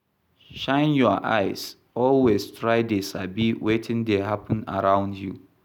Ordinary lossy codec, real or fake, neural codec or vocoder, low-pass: none; real; none; 19.8 kHz